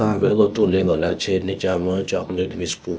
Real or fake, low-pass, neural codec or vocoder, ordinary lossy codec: fake; none; codec, 16 kHz, 0.8 kbps, ZipCodec; none